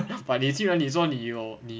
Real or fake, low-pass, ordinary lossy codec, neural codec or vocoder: real; none; none; none